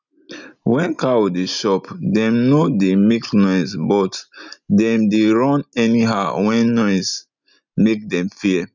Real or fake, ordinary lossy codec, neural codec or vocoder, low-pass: real; none; none; 7.2 kHz